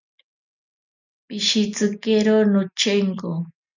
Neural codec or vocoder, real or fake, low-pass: none; real; 7.2 kHz